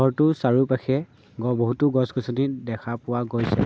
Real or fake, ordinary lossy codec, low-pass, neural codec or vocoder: real; none; none; none